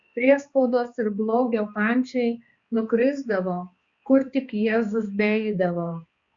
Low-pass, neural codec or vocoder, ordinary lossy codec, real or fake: 7.2 kHz; codec, 16 kHz, 2 kbps, X-Codec, HuBERT features, trained on balanced general audio; Opus, 64 kbps; fake